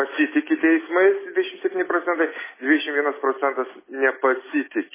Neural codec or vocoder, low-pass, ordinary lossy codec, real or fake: none; 3.6 kHz; MP3, 16 kbps; real